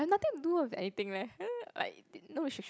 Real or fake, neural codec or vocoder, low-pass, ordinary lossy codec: real; none; none; none